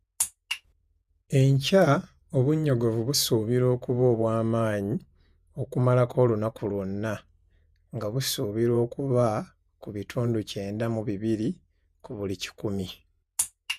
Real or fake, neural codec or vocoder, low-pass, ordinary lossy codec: fake; vocoder, 48 kHz, 128 mel bands, Vocos; 14.4 kHz; none